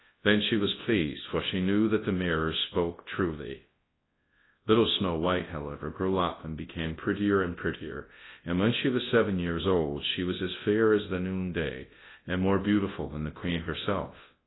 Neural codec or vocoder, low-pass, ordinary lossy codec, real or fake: codec, 24 kHz, 0.9 kbps, WavTokenizer, large speech release; 7.2 kHz; AAC, 16 kbps; fake